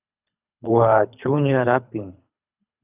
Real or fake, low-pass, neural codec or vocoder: fake; 3.6 kHz; codec, 24 kHz, 3 kbps, HILCodec